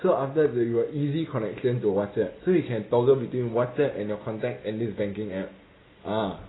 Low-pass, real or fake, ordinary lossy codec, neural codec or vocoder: 7.2 kHz; real; AAC, 16 kbps; none